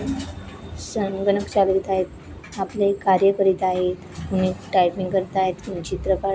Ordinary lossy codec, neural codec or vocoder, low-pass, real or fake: none; none; none; real